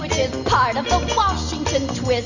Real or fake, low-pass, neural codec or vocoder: real; 7.2 kHz; none